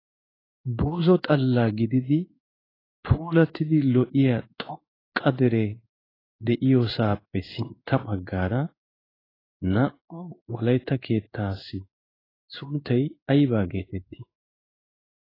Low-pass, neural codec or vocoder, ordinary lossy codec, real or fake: 5.4 kHz; codec, 16 kHz in and 24 kHz out, 1 kbps, XY-Tokenizer; AAC, 24 kbps; fake